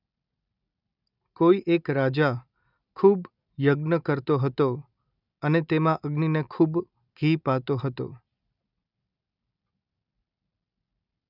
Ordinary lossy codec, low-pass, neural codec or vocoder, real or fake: none; 5.4 kHz; none; real